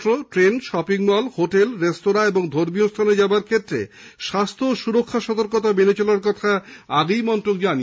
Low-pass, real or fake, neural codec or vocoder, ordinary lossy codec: none; real; none; none